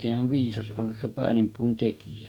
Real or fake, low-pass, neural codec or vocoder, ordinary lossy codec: fake; 19.8 kHz; codec, 44.1 kHz, 2.6 kbps, DAC; none